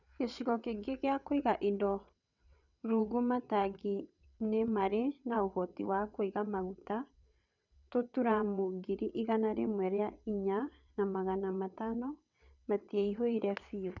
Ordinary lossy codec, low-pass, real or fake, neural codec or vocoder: none; 7.2 kHz; fake; vocoder, 22.05 kHz, 80 mel bands, WaveNeXt